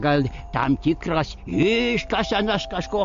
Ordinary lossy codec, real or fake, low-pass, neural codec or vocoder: MP3, 48 kbps; real; 7.2 kHz; none